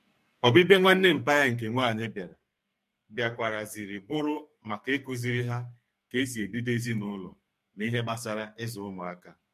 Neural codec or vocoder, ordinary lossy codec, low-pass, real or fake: codec, 44.1 kHz, 2.6 kbps, SNAC; MP3, 64 kbps; 14.4 kHz; fake